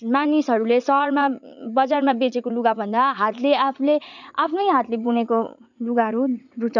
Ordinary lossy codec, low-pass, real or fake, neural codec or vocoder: none; 7.2 kHz; fake; vocoder, 44.1 kHz, 80 mel bands, Vocos